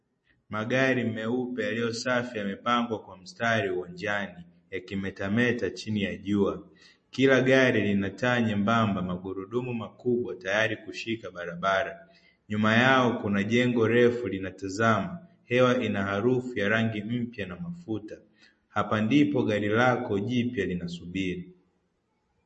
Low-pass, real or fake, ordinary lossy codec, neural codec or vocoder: 10.8 kHz; real; MP3, 32 kbps; none